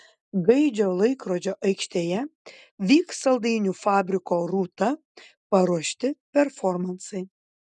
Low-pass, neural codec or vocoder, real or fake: 10.8 kHz; none; real